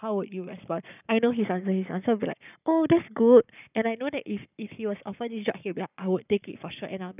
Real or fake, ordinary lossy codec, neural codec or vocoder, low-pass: fake; none; codec, 44.1 kHz, 7.8 kbps, Pupu-Codec; 3.6 kHz